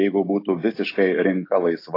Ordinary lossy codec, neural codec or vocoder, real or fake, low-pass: AAC, 32 kbps; none; real; 5.4 kHz